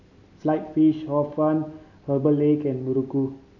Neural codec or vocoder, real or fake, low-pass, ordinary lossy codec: none; real; 7.2 kHz; none